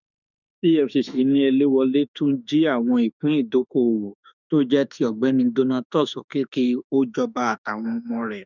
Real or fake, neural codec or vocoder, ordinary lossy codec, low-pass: fake; autoencoder, 48 kHz, 32 numbers a frame, DAC-VAE, trained on Japanese speech; none; 7.2 kHz